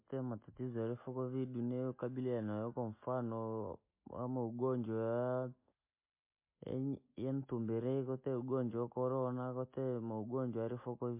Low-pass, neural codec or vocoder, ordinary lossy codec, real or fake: 3.6 kHz; none; MP3, 24 kbps; real